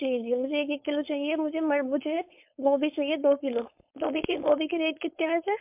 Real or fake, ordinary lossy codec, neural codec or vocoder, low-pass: fake; MP3, 32 kbps; codec, 16 kHz, 4.8 kbps, FACodec; 3.6 kHz